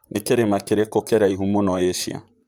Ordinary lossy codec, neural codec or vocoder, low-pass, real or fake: none; vocoder, 44.1 kHz, 128 mel bands, Pupu-Vocoder; none; fake